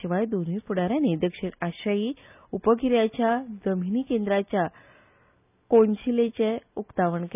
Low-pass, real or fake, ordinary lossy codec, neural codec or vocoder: 3.6 kHz; real; none; none